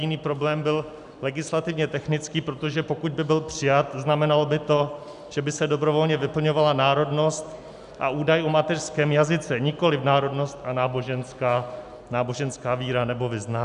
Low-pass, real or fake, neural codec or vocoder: 10.8 kHz; real; none